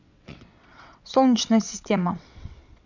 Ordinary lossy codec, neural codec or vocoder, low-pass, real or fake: none; vocoder, 22.05 kHz, 80 mel bands, WaveNeXt; 7.2 kHz; fake